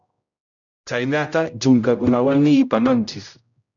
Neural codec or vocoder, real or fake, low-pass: codec, 16 kHz, 0.5 kbps, X-Codec, HuBERT features, trained on general audio; fake; 7.2 kHz